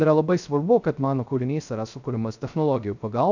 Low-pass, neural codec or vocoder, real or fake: 7.2 kHz; codec, 16 kHz, 0.3 kbps, FocalCodec; fake